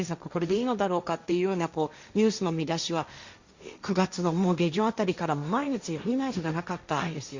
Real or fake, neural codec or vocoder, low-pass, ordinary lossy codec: fake; codec, 16 kHz, 1.1 kbps, Voila-Tokenizer; 7.2 kHz; Opus, 64 kbps